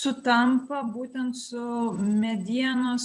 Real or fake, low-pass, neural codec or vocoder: fake; 10.8 kHz; vocoder, 44.1 kHz, 128 mel bands every 256 samples, BigVGAN v2